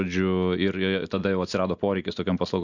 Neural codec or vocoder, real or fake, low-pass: none; real; 7.2 kHz